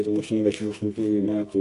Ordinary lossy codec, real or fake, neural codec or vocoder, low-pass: AAC, 48 kbps; fake; codec, 24 kHz, 0.9 kbps, WavTokenizer, medium music audio release; 10.8 kHz